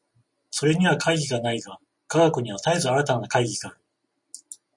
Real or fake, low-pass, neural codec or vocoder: real; 10.8 kHz; none